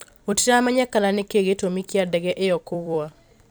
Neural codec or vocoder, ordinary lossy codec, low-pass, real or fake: vocoder, 44.1 kHz, 128 mel bands every 256 samples, BigVGAN v2; none; none; fake